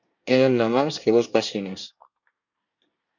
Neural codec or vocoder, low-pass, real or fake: codec, 24 kHz, 1 kbps, SNAC; 7.2 kHz; fake